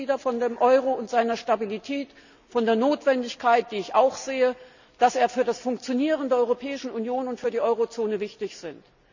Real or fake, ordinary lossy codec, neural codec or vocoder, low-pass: real; none; none; 7.2 kHz